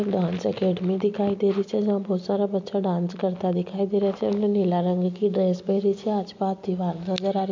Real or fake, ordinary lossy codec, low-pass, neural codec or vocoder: real; MP3, 48 kbps; 7.2 kHz; none